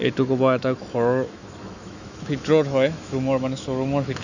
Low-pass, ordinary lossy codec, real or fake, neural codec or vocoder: 7.2 kHz; none; fake; autoencoder, 48 kHz, 128 numbers a frame, DAC-VAE, trained on Japanese speech